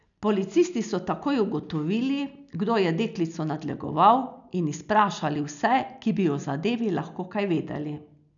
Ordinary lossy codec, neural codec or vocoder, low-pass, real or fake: none; none; 7.2 kHz; real